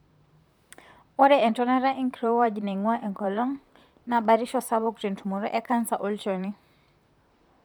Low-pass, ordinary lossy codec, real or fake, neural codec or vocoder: none; none; fake; vocoder, 44.1 kHz, 128 mel bands, Pupu-Vocoder